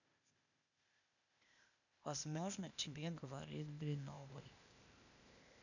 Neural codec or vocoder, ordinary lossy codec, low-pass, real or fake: codec, 16 kHz, 0.8 kbps, ZipCodec; none; 7.2 kHz; fake